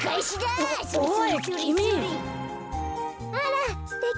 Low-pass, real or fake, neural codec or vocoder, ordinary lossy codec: none; real; none; none